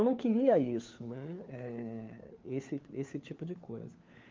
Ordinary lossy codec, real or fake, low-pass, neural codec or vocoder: Opus, 32 kbps; fake; 7.2 kHz; codec, 16 kHz, 4 kbps, FunCodec, trained on LibriTTS, 50 frames a second